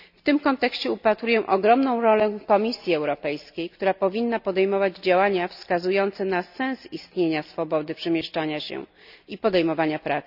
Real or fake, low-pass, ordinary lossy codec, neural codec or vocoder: real; 5.4 kHz; none; none